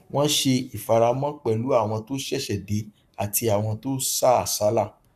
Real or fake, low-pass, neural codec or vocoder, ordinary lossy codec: fake; 14.4 kHz; codec, 44.1 kHz, 7.8 kbps, Pupu-Codec; none